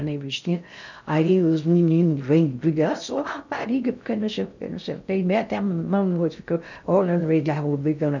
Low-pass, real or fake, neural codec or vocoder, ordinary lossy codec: 7.2 kHz; fake; codec, 16 kHz in and 24 kHz out, 0.6 kbps, FocalCodec, streaming, 2048 codes; none